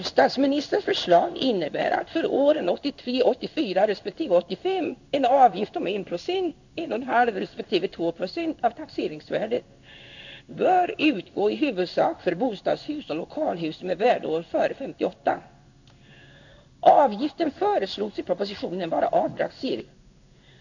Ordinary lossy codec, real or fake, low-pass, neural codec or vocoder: none; fake; 7.2 kHz; codec, 16 kHz in and 24 kHz out, 1 kbps, XY-Tokenizer